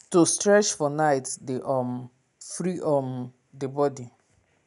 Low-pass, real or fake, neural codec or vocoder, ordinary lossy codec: 10.8 kHz; real; none; none